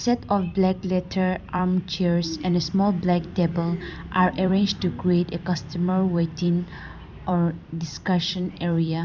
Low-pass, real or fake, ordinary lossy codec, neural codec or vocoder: 7.2 kHz; fake; none; vocoder, 44.1 kHz, 128 mel bands every 256 samples, BigVGAN v2